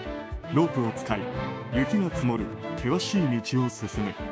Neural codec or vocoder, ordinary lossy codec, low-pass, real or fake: codec, 16 kHz, 6 kbps, DAC; none; none; fake